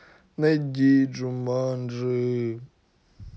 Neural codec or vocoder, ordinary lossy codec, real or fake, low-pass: none; none; real; none